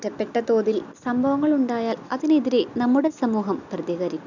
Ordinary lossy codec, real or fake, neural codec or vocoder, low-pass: none; real; none; 7.2 kHz